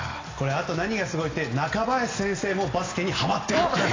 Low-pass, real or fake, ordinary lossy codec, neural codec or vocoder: 7.2 kHz; real; AAC, 32 kbps; none